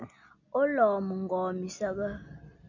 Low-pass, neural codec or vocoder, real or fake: 7.2 kHz; none; real